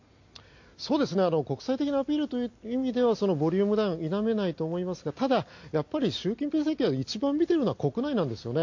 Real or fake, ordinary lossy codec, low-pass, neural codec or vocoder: real; AAC, 48 kbps; 7.2 kHz; none